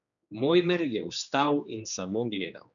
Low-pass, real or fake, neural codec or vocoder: 7.2 kHz; fake; codec, 16 kHz, 2 kbps, X-Codec, HuBERT features, trained on general audio